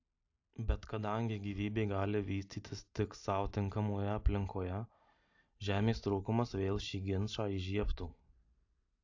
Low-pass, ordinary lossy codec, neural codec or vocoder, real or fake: 7.2 kHz; AAC, 48 kbps; none; real